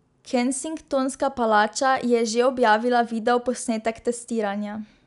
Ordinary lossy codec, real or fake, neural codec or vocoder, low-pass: none; real; none; 10.8 kHz